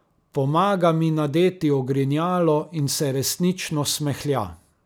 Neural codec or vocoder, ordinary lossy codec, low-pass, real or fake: none; none; none; real